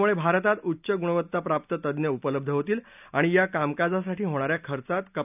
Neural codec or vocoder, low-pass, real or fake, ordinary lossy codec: none; 3.6 kHz; real; none